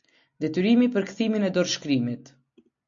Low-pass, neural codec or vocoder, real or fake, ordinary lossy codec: 7.2 kHz; none; real; MP3, 48 kbps